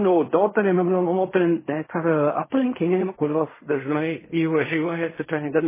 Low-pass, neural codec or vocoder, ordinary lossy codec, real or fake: 3.6 kHz; codec, 16 kHz in and 24 kHz out, 0.4 kbps, LongCat-Audio-Codec, fine tuned four codebook decoder; MP3, 16 kbps; fake